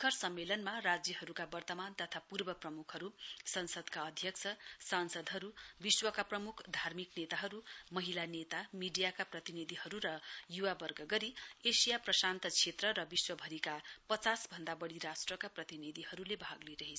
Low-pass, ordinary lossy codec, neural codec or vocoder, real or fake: none; none; none; real